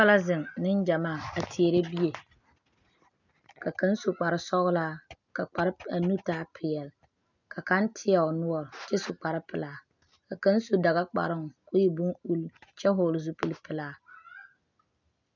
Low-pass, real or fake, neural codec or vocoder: 7.2 kHz; real; none